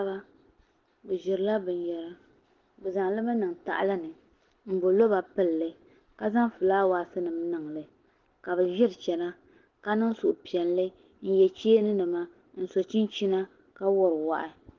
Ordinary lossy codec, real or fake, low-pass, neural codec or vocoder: Opus, 16 kbps; real; 7.2 kHz; none